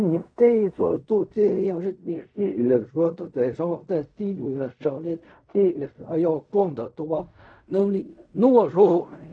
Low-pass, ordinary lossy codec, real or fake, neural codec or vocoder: 9.9 kHz; none; fake; codec, 16 kHz in and 24 kHz out, 0.4 kbps, LongCat-Audio-Codec, fine tuned four codebook decoder